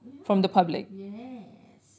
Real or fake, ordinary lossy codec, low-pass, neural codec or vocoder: real; none; none; none